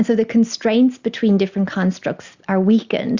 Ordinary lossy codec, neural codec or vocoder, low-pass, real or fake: Opus, 64 kbps; none; 7.2 kHz; real